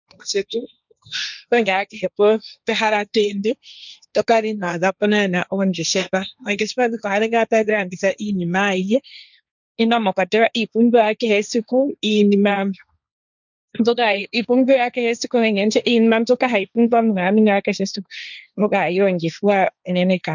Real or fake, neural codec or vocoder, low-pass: fake; codec, 16 kHz, 1.1 kbps, Voila-Tokenizer; 7.2 kHz